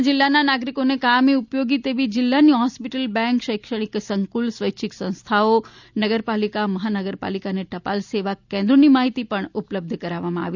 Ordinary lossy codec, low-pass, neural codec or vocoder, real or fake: none; 7.2 kHz; none; real